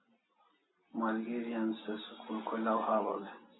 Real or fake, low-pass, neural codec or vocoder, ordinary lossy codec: real; 7.2 kHz; none; AAC, 16 kbps